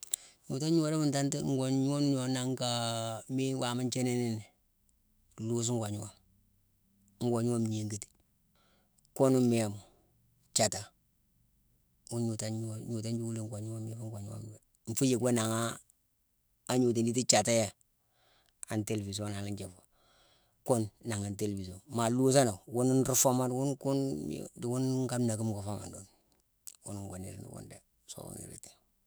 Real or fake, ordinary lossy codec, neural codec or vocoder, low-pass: fake; none; autoencoder, 48 kHz, 128 numbers a frame, DAC-VAE, trained on Japanese speech; none